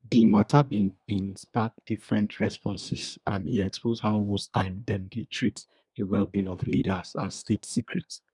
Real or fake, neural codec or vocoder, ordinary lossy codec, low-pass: fake; codec, 24 kHz, 1 kbps, SNAC; none; 10.8 kHz